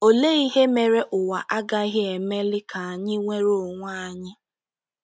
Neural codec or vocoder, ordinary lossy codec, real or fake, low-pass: none; none; real; none